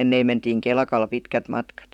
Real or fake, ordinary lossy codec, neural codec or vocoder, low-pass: fake; none; vocoder, 44.1 kHz, 128 mel bands, Pupu-Vocoder; 19.8 kHz